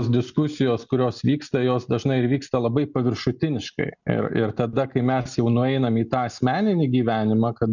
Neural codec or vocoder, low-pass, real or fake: none; 7.2 kHz; real